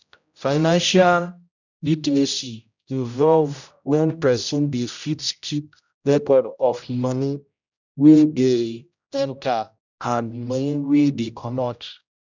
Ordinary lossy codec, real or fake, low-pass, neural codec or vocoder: none; fake; 7.2 kHz; codec, 16 kHz, 0.5 kbps, X-Codec, HuBERT features, trained on general audio